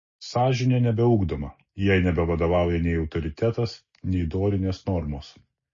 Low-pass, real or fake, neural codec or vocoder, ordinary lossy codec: 7.2 kHz; real; none; MP3, 32 kbps